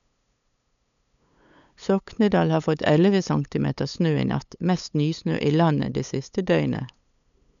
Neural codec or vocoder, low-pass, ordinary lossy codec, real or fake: codec, 16 kHz, 8 kbps, FunCodec, trained on LibriTTS, 25 frames a second; 7.2 kHz; none; fake